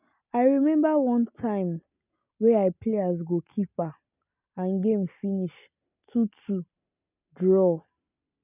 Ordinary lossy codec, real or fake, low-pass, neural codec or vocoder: none; real; 3.6 kHz; none